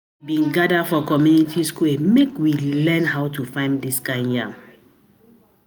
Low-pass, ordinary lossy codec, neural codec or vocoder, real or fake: none; none; none; real